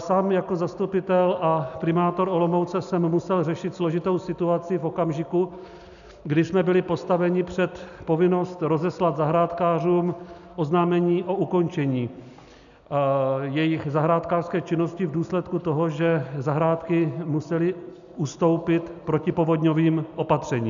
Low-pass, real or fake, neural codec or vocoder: 7.2 kHz; real; none